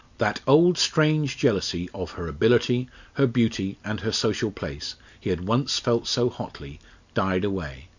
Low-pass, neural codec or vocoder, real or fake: 7.2 kHz; none; real